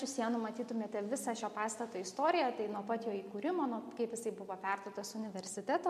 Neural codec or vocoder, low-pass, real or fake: none; 14.4 kHz; real